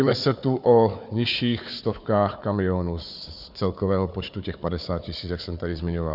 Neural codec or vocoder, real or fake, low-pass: codec, 16 kHz, 4 kbps, FunCodec, trained on Chinese and English, 50 frames a second; fake; 5.4 kHz